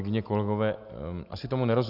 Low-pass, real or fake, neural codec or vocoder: 5.4 kHz; real; none